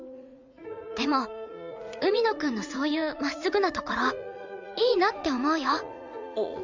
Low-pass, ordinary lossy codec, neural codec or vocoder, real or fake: 7.2 kHz; MP3, 64 kbps; vocoder, 44.1 kHz, 80 mel bands, Vocos; fake